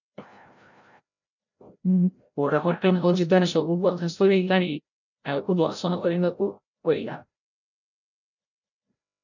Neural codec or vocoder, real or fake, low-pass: codec, 16 kHz, 0.5 kbps, FreqCodec, larger model; fake; 7.2 kHz